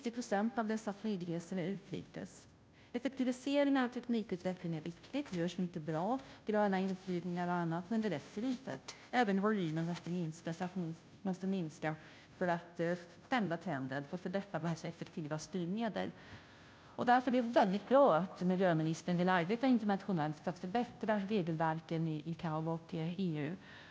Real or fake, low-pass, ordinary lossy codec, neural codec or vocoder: fake; none; none; codec, 16 kHz, 0.5 kbps, FunCodec, trained on Chinese and English, 25 frames a second